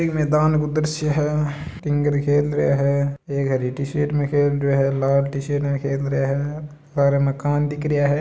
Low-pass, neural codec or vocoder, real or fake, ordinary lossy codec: none; none; real; none